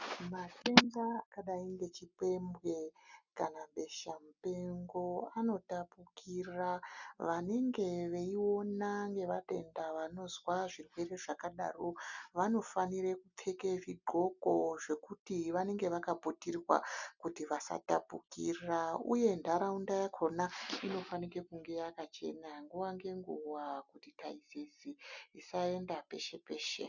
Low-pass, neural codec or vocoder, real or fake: 7.2 kHz; none; real